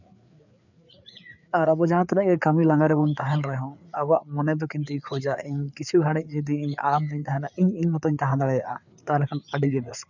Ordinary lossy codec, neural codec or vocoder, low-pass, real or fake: none; codec, 16 kHz, 4 kbps, FreqCodec, larger model; 7.2 kHz; fake